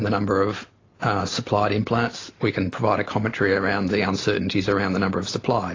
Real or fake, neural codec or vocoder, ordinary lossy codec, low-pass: real; none; AAC, 32 kbps; 7.2 kHz